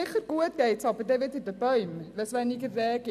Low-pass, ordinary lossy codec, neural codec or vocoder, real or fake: 14.4 kHz; none; none; real